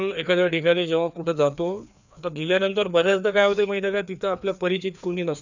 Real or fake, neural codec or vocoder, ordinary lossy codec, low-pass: fake; codec, 16 kHz, 2 kbps, FreqCodec, larger model; none; 7.2 kHz